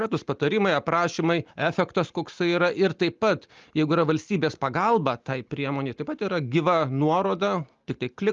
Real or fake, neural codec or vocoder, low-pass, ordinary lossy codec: real; none; 7.2 kHz; Opus, 32 kbps